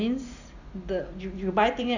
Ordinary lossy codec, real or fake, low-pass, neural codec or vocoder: Opus, 64 kbps; real; 7.2 kHz; none